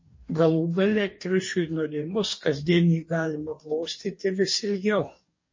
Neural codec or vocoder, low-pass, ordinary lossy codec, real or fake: codec, 44.1 kHz, 2.6 kbps, DAC; 7.2 kHz; MP3, 32 kbps; fake